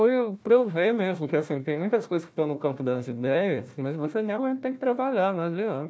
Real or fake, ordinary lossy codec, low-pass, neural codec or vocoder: fake; none; none; codec, 16 kHz, 1 kbps, FunCodec, trained on Chinese and English, 50 frames a second